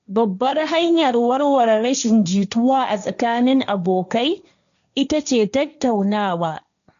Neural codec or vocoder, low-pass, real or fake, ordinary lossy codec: codec, 16 kHz, 1.1 kbps, Voila-Tokenizer; 7.2 kHz; fake; none